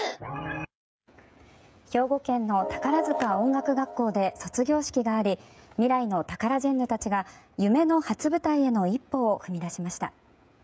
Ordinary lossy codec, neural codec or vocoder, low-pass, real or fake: none; codec, 16 kHz, 16 kbps, FreqCodec, smaller model; none; fake